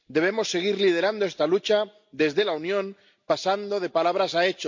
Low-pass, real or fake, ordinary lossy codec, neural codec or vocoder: 7.2 kHz; real; MP3, 48 kbps; none